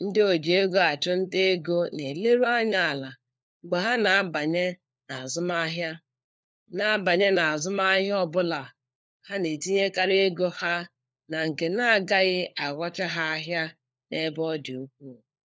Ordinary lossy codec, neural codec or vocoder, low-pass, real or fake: none; codec, 16 kHz, 4 kbps, FunCodec, trained on LibriTTS, 50 frames a second; none; fake